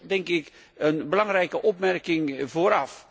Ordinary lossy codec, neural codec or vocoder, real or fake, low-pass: none; none; real; none